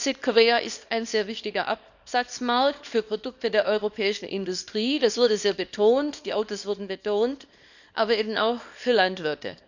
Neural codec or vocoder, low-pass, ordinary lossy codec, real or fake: codec, 24 kHz, 0.9 kbps, WavTokenizer, small release; 7.2 kHz; none; fake